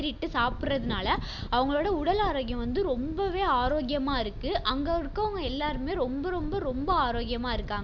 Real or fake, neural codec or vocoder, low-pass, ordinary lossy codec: real; none; 7.2 kHz; Opus, 64 kbps